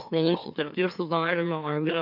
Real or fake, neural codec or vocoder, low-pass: fake; autoencoder, 44.1 kHz, a latent of 192 numbers a frame, MeloTTS; 5.4 kHz